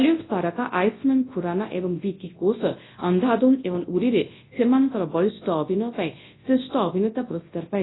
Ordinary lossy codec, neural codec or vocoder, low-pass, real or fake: AAC, 16 kbps; codec, 24 kHz, 0.9 kbps, WavTokenizer, large speech release; 7.2 kHz; fake